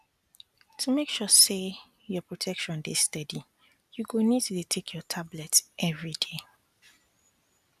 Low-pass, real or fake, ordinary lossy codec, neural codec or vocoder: 14.4 kHz; real; none; none